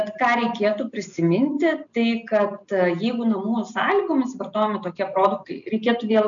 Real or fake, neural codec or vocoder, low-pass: real; none; 7.2 kHz